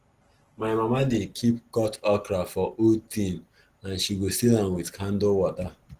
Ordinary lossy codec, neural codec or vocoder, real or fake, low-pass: Opus, 16 kbps; none; real; 14.4 kHz